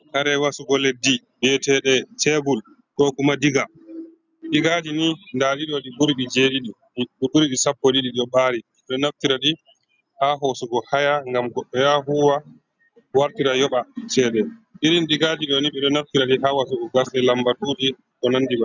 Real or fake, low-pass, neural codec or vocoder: real; 7.2 kHz; none